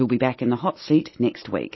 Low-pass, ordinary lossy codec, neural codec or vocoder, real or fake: 7.2 kHz; MP3, 24 kbps; none; real